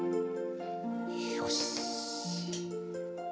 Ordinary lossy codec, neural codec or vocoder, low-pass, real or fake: none; none; none; real